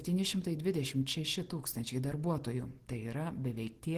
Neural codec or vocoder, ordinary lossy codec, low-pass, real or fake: none; Opus, 16 kbps; 14.4 kHz; real